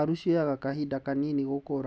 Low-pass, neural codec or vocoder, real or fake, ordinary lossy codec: none; none; real; none